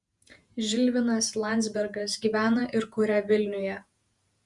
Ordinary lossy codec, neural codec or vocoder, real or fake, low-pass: Opus, 64 kbps; vocoder, 48 kHz, 128 mel bands, Vocos; fake; 10.8 kHz